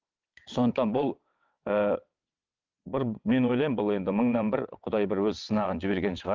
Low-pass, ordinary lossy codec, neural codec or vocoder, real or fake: 7.2 kHz; Opus, 24 kbps; vocoder, 22.05 kHz, 80 mel bands, WaveNeXt; fake